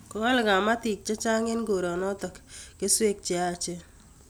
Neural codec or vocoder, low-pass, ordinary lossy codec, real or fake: none; none; none; real